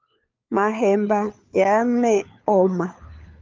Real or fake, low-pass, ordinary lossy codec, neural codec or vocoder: fake; 7.2 kHz; Opus, 24 kbps; codec, 16 kHz, 4 kbps, FunCodec, trained on LibriTTS, 50 frames a second